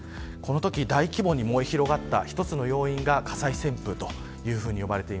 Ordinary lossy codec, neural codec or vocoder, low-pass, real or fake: none; none; none; real